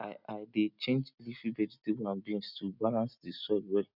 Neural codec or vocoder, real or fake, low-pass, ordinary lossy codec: none; real; 5.4 kHz; none